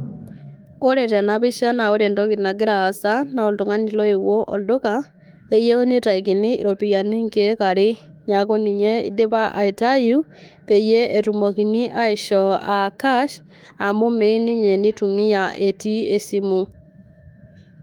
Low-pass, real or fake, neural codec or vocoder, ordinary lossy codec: 19.8 kHz; fake; autoencoder, 48 kHz, 32 numbers a frame, DAC-VAE, trained on Japanese speech; Opus, 32 kbps